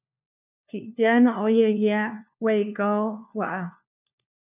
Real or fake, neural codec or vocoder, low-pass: fake; codec, 16 kHz, 1 kbps, FunCodec, trained on LibriTTS, 50 frames a second; 3.6 kHz